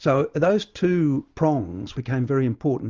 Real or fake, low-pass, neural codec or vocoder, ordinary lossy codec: real; 7.2 kHz; none; Opus, 32 kbps